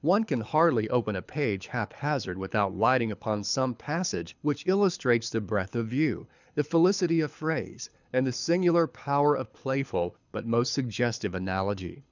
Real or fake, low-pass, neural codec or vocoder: fake; 7.2 kHz; codec, 24 kHz, 6 kbps, HILCodec